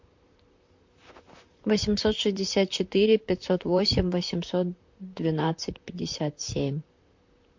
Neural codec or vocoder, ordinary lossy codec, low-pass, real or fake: vocoder, 44.1 kHz, 128 mel bands, Pupu-Vocoder; MP3, 48 kbps; 7.2 kHz; fake